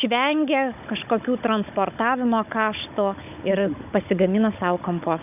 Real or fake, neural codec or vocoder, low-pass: fake; codec, 16 kHz, 16 kbps, FunCodec, trained on Chinese and English, 50 frames a second; 3.6 kHz